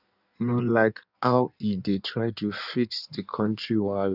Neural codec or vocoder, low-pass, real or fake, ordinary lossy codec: codec, 16 kHz in and 24 kHz out, 1.1 kbps, FireRedTTS-2 codec; 5.4 kHz; fake; none